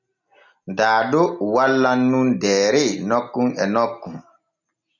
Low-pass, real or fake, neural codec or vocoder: 7.2 kHz; real; none